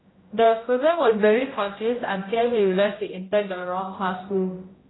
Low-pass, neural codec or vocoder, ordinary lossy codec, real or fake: 7.2 kHz; codec, 16 kHz, 0.5 kbps, X-Codec, HuBERT features, trained on general audio; AAC, 16 kbps; fake